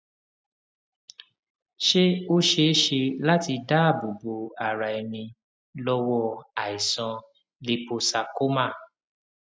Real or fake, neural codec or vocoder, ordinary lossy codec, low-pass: real; none; none; none